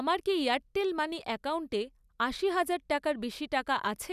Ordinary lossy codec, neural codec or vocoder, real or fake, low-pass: none; none; real; 14.4 kHz